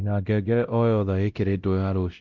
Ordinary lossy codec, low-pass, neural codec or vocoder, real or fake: Opus, 24 kbps; 7.2 kHz; codec, 16 kHz, 0.5 kbps, X-Codec, WavLM features, trained on Multilingual LibriSpeech; fake